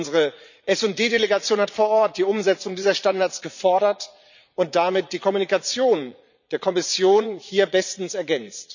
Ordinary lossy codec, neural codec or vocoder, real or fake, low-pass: none; vocoder, 44.1 kHz, 80 mel bands, Vocos; fake; 7.2 kHz